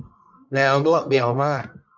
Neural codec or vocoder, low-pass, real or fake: codec, 44.1 kHz, 1.7 kbps, Pupu-Codec; 7.2 kHz; fake